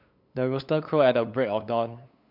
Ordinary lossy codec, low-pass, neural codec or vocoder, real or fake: MP3, 48 kbps; 5.4 kHz; codec, 16 kHz, 8 kbps, FunCodec, trained on LibriTTS, 25 frames a second; fake